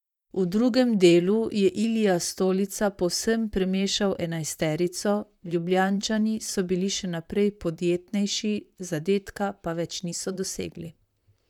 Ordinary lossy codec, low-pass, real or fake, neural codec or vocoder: none; 19.8 kHz; fake; vocoder, 44.1 kHz, 128 mel bands, Pupu-Vocoder